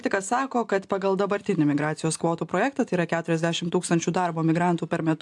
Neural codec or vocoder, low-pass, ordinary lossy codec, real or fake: none; 10.8 kHz; AAC, 64 kbps; real